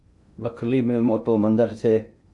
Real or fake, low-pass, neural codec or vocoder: fake; 10.8 kHz; codec, 16 kHz in and 24 kHz out, 0.6 kbps, FocalCodec, streaming, 2048 codes